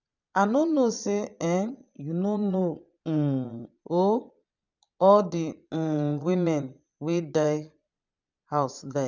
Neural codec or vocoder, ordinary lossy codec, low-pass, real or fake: vocoder, 22.05 kHz, 80 mel bands, Vocos; none; 7.2 kHz; fake